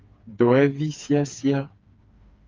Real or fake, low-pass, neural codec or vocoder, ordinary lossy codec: fake; 7.2 kHz; codec, 16 kHz, 4 kbps, FreqCodec, smaller model; Opus, 32 kbps